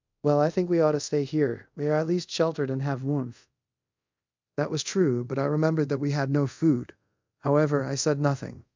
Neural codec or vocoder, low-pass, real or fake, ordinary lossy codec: codec, 24 kHz, 0.5 kbps, DualCodec; 7.2 kHz; fake; MP3, 64 kbps